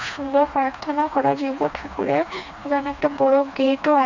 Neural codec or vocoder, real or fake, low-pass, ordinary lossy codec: codec, 16 kHz, 2 kbps, FreqCodec, smaller model; fake; 7.2 kHz; MP3, 64 kbps